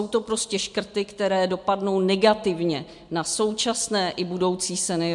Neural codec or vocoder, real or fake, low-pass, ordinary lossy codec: none; real; 10.8 kHz; MP3, 64 kbps